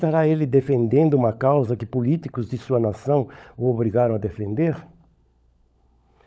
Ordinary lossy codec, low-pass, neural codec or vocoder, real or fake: none; none; codec, 16 kHz, 16 kbps, FunCodec, trained on LibriTTS, 50 frames a second; fake